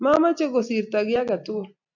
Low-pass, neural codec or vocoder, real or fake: 7.2 kHz; none; real